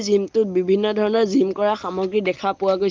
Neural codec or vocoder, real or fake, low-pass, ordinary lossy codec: none; real; 7.2 kHz; Opus, 32 kbps